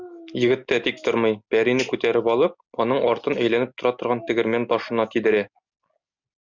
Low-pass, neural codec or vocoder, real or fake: 7.2 kHz; none; real